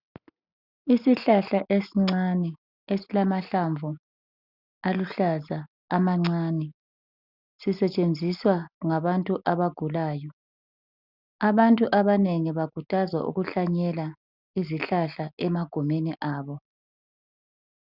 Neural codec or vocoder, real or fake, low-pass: none; real; 5.4 kHz